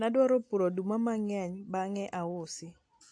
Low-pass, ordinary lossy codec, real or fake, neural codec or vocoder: 9.9 kHz; AAC, 48 kbps; real; none